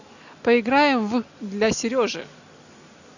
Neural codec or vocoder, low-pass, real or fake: none; 7.2 kHz; real